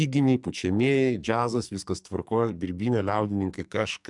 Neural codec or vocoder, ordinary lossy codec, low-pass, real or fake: codec, 44.1 kHz, 2.6 kbps, SNAC; MP3, 96 kbps; 10.8 kHz; fake